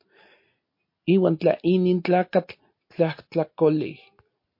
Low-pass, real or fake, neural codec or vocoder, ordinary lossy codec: 5.4 kHz; real; none; MP3, 32 kbps